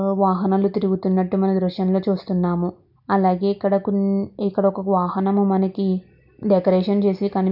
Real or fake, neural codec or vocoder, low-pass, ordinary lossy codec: real; none; 5.4 kHz; none